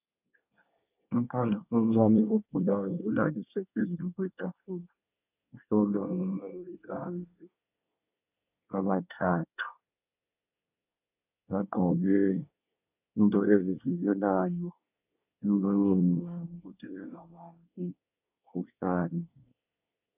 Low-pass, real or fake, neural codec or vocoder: 3.6 kHz; fake; codec, 24 kHz, 1 kbps, SNAC